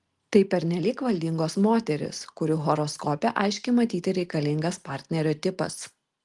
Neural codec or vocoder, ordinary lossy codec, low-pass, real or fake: none; Opus, 24 kbps; 10.8 kHz; real